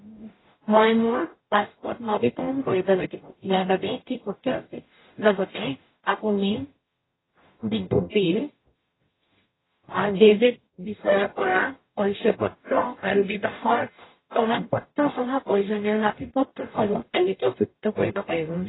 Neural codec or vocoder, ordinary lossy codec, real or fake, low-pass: codec, 44.1 kHz, 0.9 kbps, DAC; AAC, 16 kbps; fake; 7.2 kHz